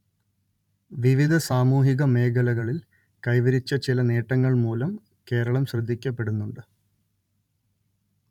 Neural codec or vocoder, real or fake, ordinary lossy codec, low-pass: vocoder, 44.1 kHz, 128 mel bands every 512 samples, BigVGAN v2; fake; none; 19.8 kHz